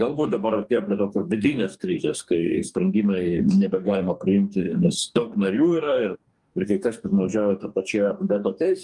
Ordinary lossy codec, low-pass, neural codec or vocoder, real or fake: Opus, 32 kbps; 10.8 kHz; codec, 44.1 kHz, 2.6 kbps, DAC; fake